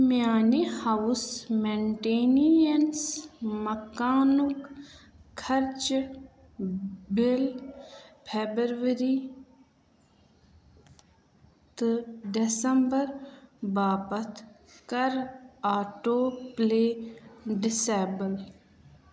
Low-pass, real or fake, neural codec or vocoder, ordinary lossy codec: none; real; none; none